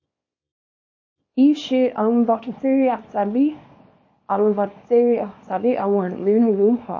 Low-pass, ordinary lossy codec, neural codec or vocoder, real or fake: 7.2 kHz; MP3, 32 kbps; codec, 24 kHz, 0.9 kbps, WavTokenizer, small release; fake